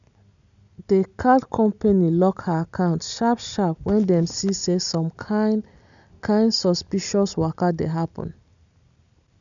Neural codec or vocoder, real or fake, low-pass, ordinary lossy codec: none; real; 7.2 kHz; none